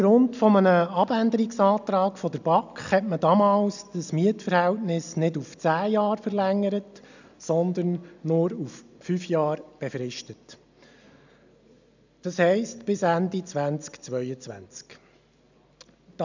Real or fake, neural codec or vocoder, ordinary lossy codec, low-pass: real; none; none; 7.2 kHz